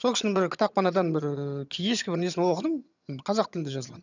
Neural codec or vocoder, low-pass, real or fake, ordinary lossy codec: vocoder, 22.05 kHz, 80 mel bands, HiFi-GAN; 7.2 kHz; fake; none